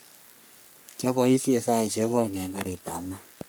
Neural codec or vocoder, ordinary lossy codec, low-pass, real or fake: codec, 44.1 kHz, 3.4 kbps, Pupu-Codec; none; none; fake